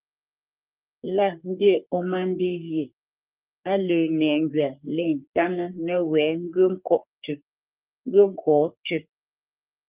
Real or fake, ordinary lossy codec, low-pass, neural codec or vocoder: fake; Opus, 24 kbps; 3.6 kHz; codec, 44.1 kHz, 3.4 kbps, Pupu-Codec